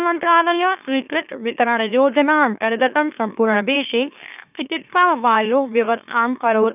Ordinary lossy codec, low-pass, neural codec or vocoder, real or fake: none; 3.6 kHz; autoencoder, 44.1 kHz, a latent of 192 numbers a frame, MeloTTS; fake